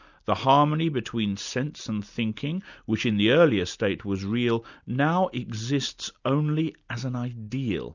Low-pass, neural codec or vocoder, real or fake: 7.2 kHz; none; real